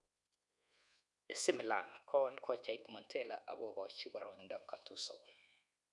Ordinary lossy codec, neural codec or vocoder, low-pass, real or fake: none; codec, 24 kHz, 1.2 kbps, DualCodec; 10.8 kHz; fake